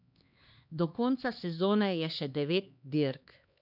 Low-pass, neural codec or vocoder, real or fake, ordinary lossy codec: 5.4 kHz; codec, 16 kHz, 2 kbps, X-Codec, HuBERT features, trained on LibriSpeech; fake; none